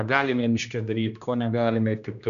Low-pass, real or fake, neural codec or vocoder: 7.2 kHz; fake; codec, 16 kHz, 1 kbps, X-Codec, HuBERT features, trained on general audio